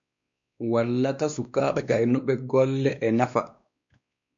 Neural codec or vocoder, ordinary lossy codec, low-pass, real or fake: codec, 16 kHz, 2 kbps, X-Codec, WavLM features, trained on Multilingual LibriSpeech; MP3, 64 kbps; 7.2 kHz; fake